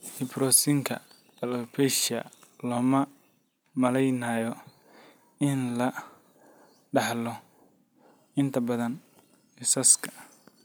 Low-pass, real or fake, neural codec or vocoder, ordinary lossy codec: none; real; none; none